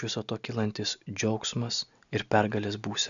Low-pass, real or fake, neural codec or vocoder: 7.2 kHz; real; none